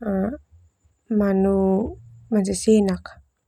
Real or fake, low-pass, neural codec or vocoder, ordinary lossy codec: real; 19.8 kHz; none; none